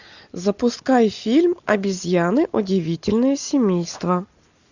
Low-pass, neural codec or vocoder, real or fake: 7.2 kHz; none; real